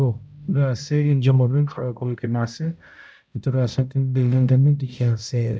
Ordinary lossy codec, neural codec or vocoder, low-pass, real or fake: none; codec, 16 kHz, 0.5 kbps, X-Codec, HuBERT features, trained on balanced general audio; none; fake